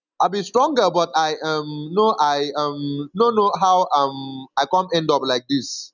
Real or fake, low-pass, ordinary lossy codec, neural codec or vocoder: real; 7.2 kHz; none; none